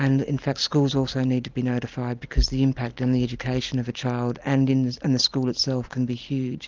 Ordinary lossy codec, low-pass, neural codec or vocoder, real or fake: Opus, 24 kbps; 7.2 kHz; none; real